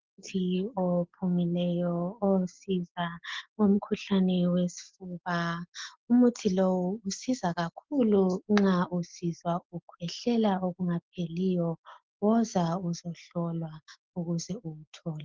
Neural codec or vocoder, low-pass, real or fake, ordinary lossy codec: none; 7.2 kHz; real; Opus, 16 kbps